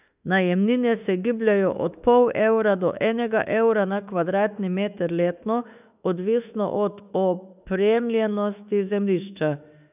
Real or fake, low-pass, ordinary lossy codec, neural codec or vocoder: fake; 3.6 kHz; none; autoencoder, 48 kHz, 32 numbers a frame, DAC-VAE, trained on Japanese speech